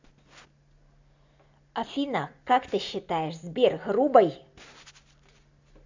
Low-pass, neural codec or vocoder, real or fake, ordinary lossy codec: 7.2 kHz; none; real; AAC, 48 kbps